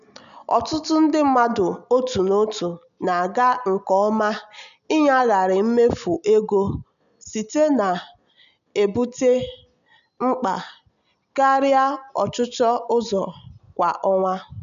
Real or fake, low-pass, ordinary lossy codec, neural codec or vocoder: real; 7.2 kHz; none; none